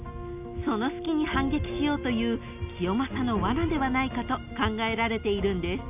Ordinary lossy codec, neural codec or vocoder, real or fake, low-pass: none; none; real; 3.6 kHz